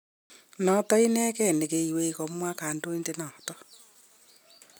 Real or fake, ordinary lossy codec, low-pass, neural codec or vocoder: real; none; none; none